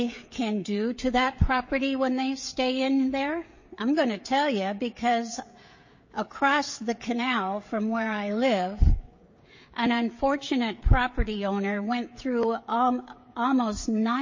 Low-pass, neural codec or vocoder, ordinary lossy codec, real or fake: 7.2 kHz; codec, 16 kHz, 16 kbps, FreqCodec, smaller model; MP3, 32 kbps; fake